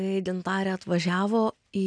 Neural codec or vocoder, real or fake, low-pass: none; real; 9.9 kHz